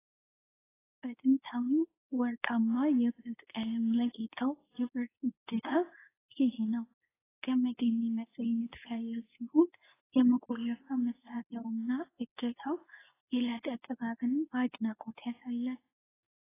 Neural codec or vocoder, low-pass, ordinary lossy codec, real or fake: codec, 24 kHz, 0.9 kbps, WavTokenizer, medium speech release version 2; 3.6 kHz; AAC, 16 kbps; fake